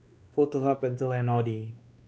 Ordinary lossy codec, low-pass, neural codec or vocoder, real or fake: none; none; codec, 16 kHz, 2 kbps, X-Codec, WavLM features, trained on Multilingual LibriSpeech; fake